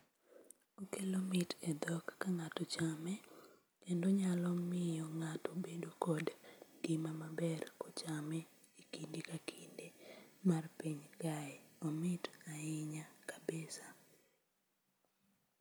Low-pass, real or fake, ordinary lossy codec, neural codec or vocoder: none; real; none; none